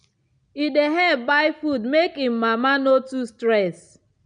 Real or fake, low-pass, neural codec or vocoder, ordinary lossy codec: real; 9.9 kHz; none; none